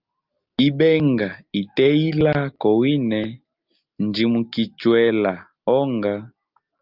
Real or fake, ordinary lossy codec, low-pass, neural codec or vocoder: real; Opus, 24 kbps; 5.4 kHz; none